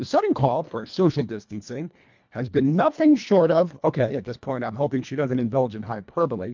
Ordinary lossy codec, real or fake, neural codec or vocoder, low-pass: MP3, 64 kbps; fake; codec, 24 kHz, 1.5 kbps, HILCodec; 7.2 kHz